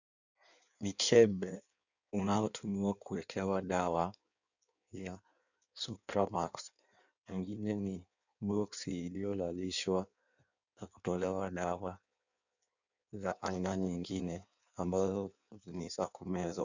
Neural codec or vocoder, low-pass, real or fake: codec, 16 kHz in and 24 kHz out, 1.1 kbps, FireRedTTS-2 codec; 7.2 kHz; fake